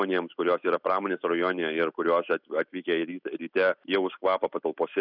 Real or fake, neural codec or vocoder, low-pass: real; none; 5.4 kHz